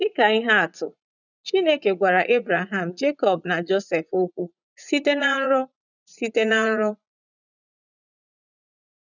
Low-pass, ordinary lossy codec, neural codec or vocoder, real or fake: 7.2 kHz; none; vocoder, 44.1 kHz, 80 mel bands, Vocos; fake